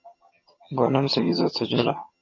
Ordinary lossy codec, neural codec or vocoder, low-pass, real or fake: MP3, 32 kbps; vocoder, 22.05 kHz, 80 mel bands, HiFi-GAN; 7.2 kHz; fake